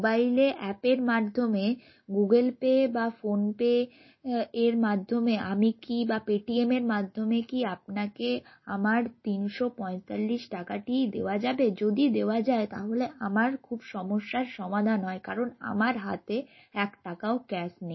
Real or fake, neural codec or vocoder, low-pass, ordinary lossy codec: real; none; 7.2 kHz; MP3, 24 kbps